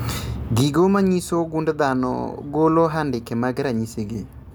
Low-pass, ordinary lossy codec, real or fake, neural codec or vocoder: none; none; real; none